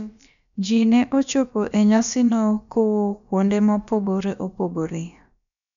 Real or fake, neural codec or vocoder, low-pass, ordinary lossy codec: fake; codec, 16 kHz, about 1 kbps, DyCAST, with the encoder's durations; 7.2 kHz; none